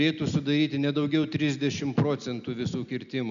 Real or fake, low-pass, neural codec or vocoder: real; 7.2 kHz; none